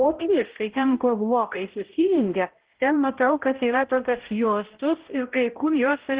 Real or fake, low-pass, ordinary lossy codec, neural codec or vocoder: fake; 3.6 kHz; Opus, 16 kbps; codec, 16 kHz, 0.5 kbps, X-Codec, HuBERT features, trained on balanced general audio